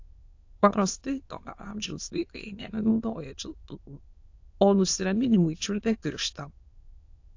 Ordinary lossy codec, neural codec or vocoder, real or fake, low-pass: AAC, 48 kbps; autoencoder, 22.05 kHz, a latent of 192 numbers a frame, VITS, trained on many speakers; fake; 7.2 kHz